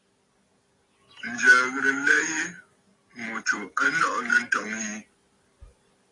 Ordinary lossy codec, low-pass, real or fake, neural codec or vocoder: AAC, 32 kbps; 10.8 kHz; real; none